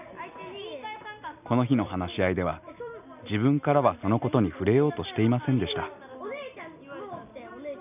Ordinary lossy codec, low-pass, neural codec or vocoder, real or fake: none; 3.6 kHz; none; real